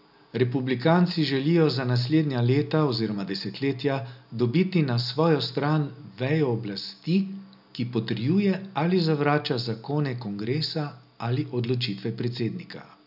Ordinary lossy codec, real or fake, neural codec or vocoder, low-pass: none; real; none; 5.4 kHz